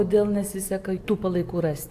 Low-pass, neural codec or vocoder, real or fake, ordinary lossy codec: 14.4 kHz; none; real; AAC, 64 kbps